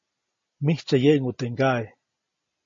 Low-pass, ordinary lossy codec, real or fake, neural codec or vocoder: 7.2 kHz; MP3, 32 kbps; real; none